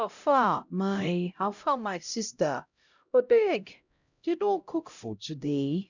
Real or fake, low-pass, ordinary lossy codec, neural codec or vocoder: fake; 7.2 kHz; none; codec, 16 kHz, 0.5 kbps, X-Codec, HuBERT features, trained on LibriSpeech